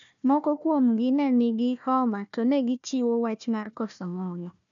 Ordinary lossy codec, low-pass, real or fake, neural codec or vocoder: none; 7.2 kHz; fake; codec, 16 kHz, 1 kbps, FunCodec, trained on Chinese and English, 50 frames a second